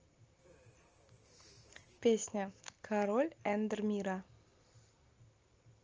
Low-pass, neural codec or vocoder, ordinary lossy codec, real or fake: 7.2 kHz; none; Opus, 24 kbps; real